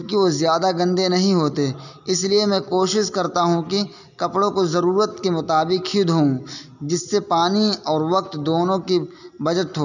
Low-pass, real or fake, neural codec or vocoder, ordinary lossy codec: 7.2 kHz; real; none; none